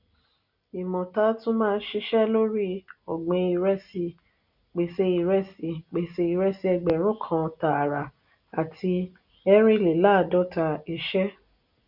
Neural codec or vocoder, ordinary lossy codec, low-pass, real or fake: none; none; 5.4 kHz; real